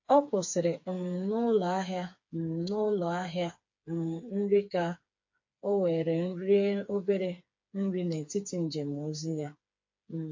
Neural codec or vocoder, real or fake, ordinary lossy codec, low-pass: codec, 16 kHz, 4 kbps, FreqCodec, smaller model; fake; MP3, 48 kbps; 7.2 kHz